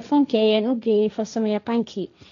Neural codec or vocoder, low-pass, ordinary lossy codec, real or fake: codec, 16 kHz, 1.1 kbps, Voila-Tokenizer; 7.2 kHz; none; fake